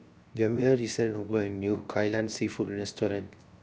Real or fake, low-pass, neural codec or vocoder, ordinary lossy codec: fake; none; codec, 16 kHz, 0.7 kbps, FocalCodec; none